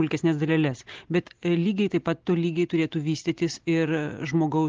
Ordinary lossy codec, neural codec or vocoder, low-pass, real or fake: Opus, 16 kbps; none; 7.2 kHz; real